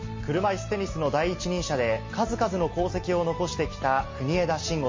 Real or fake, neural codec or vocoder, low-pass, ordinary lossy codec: real; none; 7.2 kHz; MP3, 32 kbps